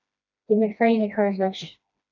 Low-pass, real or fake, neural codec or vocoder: 7.2 kHz; fake; codec, 16 kHz, 1 kbps, FreqCodec, smaller model